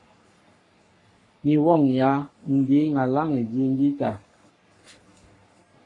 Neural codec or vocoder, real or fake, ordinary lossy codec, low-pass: codec, 44.1 kHz, 3.4 kbps, Pupu-Codec; fake; AAC, 32 kbps; 10.8 kHz